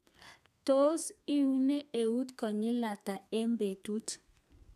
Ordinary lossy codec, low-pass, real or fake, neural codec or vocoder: none; 14.4 kHz; fake; codec, 32 kHz, 1.9 kbps, SNAC